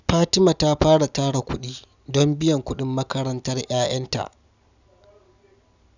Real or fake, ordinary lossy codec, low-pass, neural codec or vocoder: real; none; 7.2 kHz; none